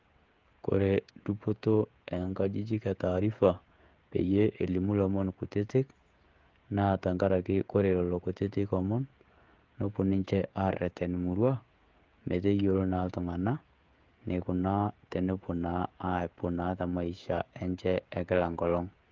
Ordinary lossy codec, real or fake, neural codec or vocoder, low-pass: Opus, 32 kbps; fake; codec, 16 kHz, 16 kbps, FreqCodec, smaller model; 7.2 kHz